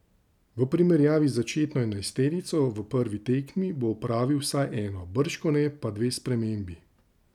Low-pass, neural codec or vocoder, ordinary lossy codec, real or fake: 19.8 kHz; none; none; real